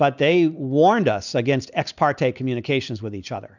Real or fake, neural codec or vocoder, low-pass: real; none; 7.2 kHz